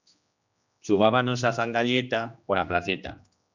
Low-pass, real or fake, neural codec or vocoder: 7.2 kHz; fake; codec, 16 kHz, 1 kbps, X-Codec, HuBERT features, trained on general audio